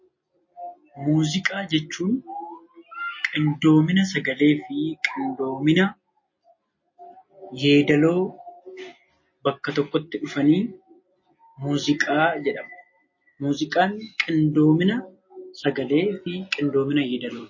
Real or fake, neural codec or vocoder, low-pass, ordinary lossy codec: real; none; 7.2 kHz; MP3, 32 kbps